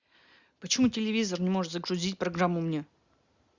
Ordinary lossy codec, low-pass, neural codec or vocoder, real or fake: Opus, 64 kbps; 7.2 kHz; none; real